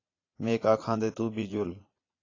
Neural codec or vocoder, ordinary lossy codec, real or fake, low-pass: vocoder, 22.05 kHz, 80 mel bands, Vocos; AAC, 32 kbps; fake; 7.2 kHz